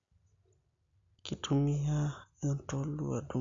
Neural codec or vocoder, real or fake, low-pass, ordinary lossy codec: none; real; 7.2 kHz; AAC, 48 kbps